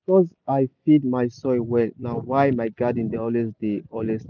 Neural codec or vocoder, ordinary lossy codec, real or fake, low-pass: none; none; real; 7.2 kHz